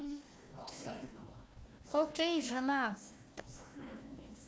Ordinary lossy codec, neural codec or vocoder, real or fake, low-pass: none; codec, 16 kHz, 1 kbps, FunCodec, trained on Chinese and English, 50 frames a second; fake; none